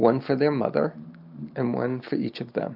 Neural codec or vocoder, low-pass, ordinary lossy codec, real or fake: none; 5.4 kHz; AAC, 48 kbps; real